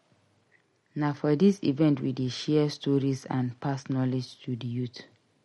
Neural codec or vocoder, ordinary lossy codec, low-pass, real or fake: none; MP3, 48 kbps; 19.8 kHz; real